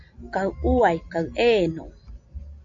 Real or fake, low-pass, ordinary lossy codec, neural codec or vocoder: real; 7.2 kHz; MP3, 48 kbps; none